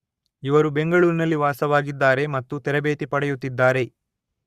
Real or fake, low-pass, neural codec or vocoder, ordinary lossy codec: fake; 14.4 kHz; codec, 44.1 kHz, 7.8 kbps, Pupu-Codec; Opus, 64 kbps